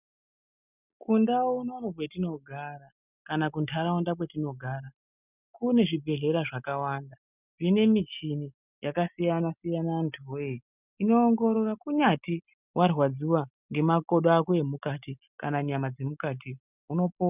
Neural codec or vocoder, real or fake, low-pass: none; real; 3.6 kHz